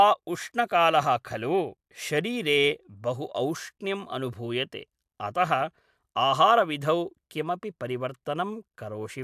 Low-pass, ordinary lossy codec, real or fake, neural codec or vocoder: 14.4 kHz; AAC, 96 kbps; real; none